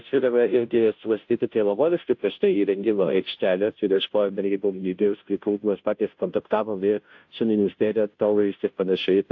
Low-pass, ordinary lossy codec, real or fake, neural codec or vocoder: 7.2 kHz; AAC, 48 kbps; fake; codec, 16 kHz, 0.5 kbps, FunCodec, trained on Chinese and English, 25 frames a second